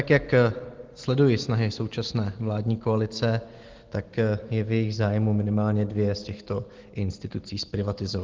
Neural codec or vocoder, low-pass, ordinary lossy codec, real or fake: none; 7.2 kHz; Opus, 16 kbps; real